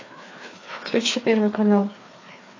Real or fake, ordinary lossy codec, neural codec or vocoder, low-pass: fake; AAC, 32 kbps; codec, 16 kHz, 2 kbps, FreqCodec, larger model; 7.2 kHz